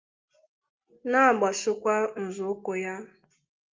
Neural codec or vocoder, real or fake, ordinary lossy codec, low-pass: none; real; Opus, 32 kbps; 7.2 kHz